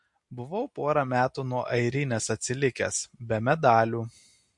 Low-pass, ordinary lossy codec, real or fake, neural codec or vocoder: 10.8 kHz; MP3, 48 kbps; real; none